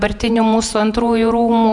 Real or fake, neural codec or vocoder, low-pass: fake; vocoder, 48 kHz, 128 mel bands, Vocos; 10.8 kHz